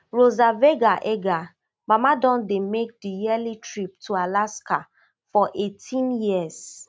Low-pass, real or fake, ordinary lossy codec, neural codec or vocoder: none; real; none; none